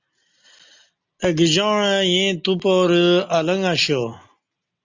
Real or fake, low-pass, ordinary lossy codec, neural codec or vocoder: real; 7.2 kHz; Opus, 64 kbps; none